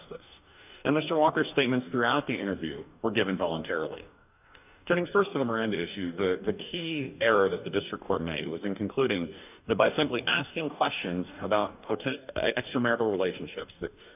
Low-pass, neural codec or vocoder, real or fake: 3.6 kHz; codec, 44.1 kHz, 2.6 kbps, DAC; fake